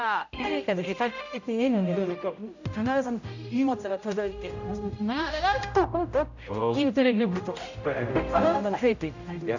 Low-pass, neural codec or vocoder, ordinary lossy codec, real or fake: 7.2 kHz; codec, 16 kHz, 0.5 kbps, X-Codec, HuBERT features, trained on general audio; none; fake